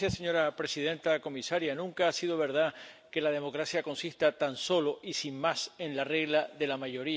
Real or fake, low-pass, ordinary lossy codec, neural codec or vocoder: real; none; none; none